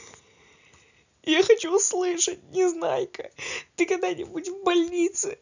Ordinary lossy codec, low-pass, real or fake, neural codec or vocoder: none; 7.2 kHz; real; none